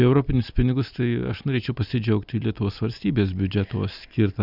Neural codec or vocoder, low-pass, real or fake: none; 5.4 kHz; real